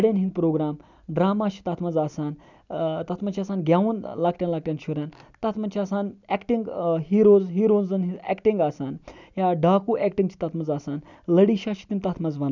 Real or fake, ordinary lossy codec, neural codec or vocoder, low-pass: real; none; none; 7.2 kHz